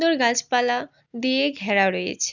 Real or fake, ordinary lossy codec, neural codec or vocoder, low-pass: real; none; none; 7.2 kHz